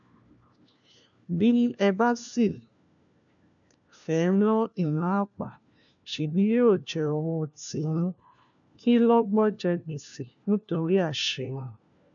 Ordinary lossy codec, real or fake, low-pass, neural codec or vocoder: none; fake; 7.2 kHz; codec, 16 kHz, 1 kbps, FunCodec, trained on LibriTTS, 50 frames a second